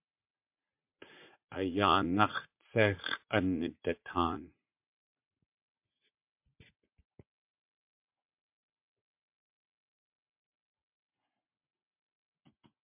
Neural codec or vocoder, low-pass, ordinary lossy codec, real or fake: vocoder, 44.1 kHz, 80 mel bands, Vocos; 3.6 kHz; MP3, 32 kbps; fake